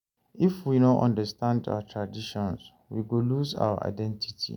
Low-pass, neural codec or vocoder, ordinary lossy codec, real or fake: 19.8 kHz; none; none; real